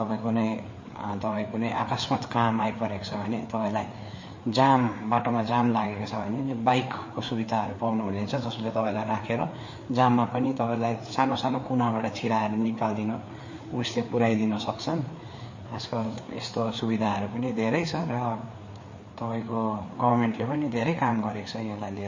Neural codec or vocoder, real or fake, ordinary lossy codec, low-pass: codec, 16 kHz, 4 kbps, FreqCodec, larger model; fake; MP3, 32 kbps; 7.2 kHz